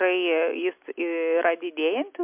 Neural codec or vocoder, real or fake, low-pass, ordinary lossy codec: none; real; 3.6 kHz; MP3, 32 kbps